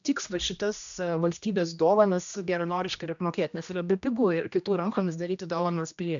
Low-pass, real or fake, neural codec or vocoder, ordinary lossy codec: 7.2 kHz; fake; codec, 16 kHz, 1 kbps, X-Codec, HuBERT features, trained on general audio; MP3, 96 kbps